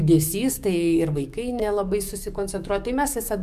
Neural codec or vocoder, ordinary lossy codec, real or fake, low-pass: codec, 44.1 kHz, 7.8 kbps, DAC; MP3, 96 kbps; fake; 14.4 kHz